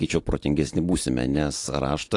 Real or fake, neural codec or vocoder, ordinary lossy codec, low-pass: real; none; AAC, 48 kbps; 10.8 kHz